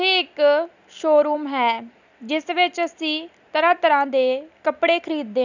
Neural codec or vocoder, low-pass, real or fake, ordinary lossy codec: none; 7.2 kHz; real; none